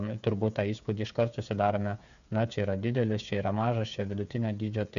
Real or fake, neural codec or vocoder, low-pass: fake; codec, 16 kHz, 8 kbps, FreqCodec, smaller model; 7.2 kHz